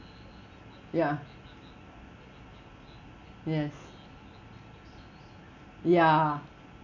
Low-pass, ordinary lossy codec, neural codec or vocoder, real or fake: 7.2 kHz; none; none; real